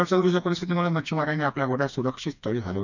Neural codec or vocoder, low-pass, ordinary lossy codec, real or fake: codec, 16 kHz, 2 kbps, FreqCodec, smaller model; 7.2 kHz; none; fake